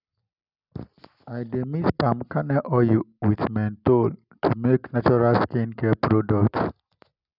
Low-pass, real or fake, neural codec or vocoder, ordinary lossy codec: 5.4 kHz; real; none; none